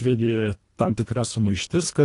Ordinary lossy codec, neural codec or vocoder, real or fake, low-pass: AAC, 48 kbps; codec, 24 kHz, 1.5 kbps, HILCodec; fake; 10.8 kHz